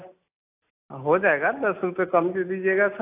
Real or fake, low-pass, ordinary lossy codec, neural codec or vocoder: real; 3.6 kHz; AAC, 24 kbps; none